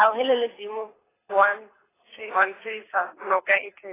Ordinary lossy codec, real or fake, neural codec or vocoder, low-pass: AAC, 16 kbps; fake; codec, 24 kHz, 6 kbps, HILCodec; 3.6 kHz